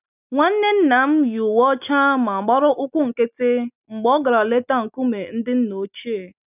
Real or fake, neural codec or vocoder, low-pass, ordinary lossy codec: real; none; 3.6 kHz; none